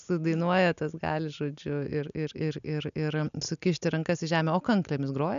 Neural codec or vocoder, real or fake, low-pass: none; real; 7.2 kHz